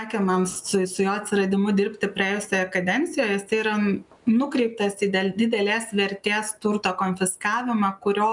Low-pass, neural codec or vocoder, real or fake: 10.8 kHz; none; real